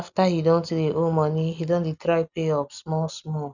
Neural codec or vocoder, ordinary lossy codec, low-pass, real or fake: none; none; 7.2 kHz; real